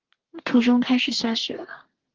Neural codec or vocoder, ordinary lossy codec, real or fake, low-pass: codec, 32 kHz, 1.9 kbps, SNAC; Opus, 16 kbps; fake; 7.2 kHz